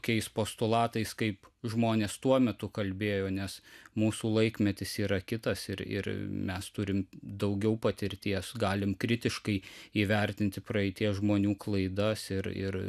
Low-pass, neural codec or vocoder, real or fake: 14.4 kHz; none; real